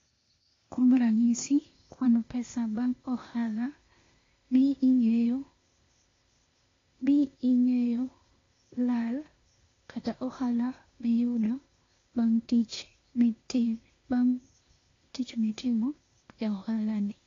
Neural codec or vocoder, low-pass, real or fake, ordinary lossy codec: codec, 16 kHz, 0.8 kbps, ZipCodec; 7.2 kHz; fake; AAC, 32 kbps